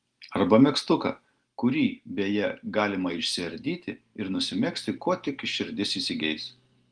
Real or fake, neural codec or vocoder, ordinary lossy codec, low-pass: real; none; Opus, 24 kbps; 9.9 kHz